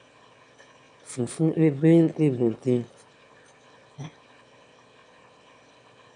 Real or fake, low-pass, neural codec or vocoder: fake; 9.9 kHz; autoencoder, 22.05 kHz, a latent of 192 numbers a frame, VITS, trained on one speaker